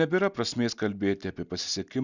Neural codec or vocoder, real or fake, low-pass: none; real; 7.2 kHz